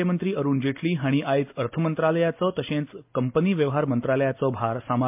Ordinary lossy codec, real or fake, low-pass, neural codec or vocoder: MP3, 32 kbps; real; 3.6 kHz; none